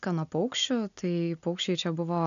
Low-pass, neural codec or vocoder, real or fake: 7.2 kHz; none; real